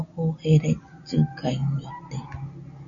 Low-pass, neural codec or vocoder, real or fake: 7.2 kHz; none; real